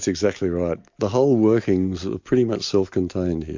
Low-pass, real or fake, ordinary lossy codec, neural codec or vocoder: 7.2 kHz; real; MP3, 48 kbps; none